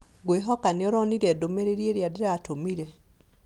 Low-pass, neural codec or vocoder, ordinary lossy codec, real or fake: 19.8 kHz; none; Opus, 32 kbps; real